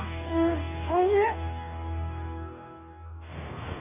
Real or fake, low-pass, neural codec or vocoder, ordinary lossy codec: fake; 3.6 kHz; codec, 44.1 kHz, 2.6 kbps, DAC; none